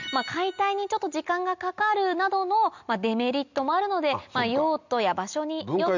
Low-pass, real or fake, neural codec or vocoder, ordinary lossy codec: 7.2 kHz; real; none; none